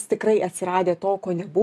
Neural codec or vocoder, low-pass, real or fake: none; 14.4 kHz; real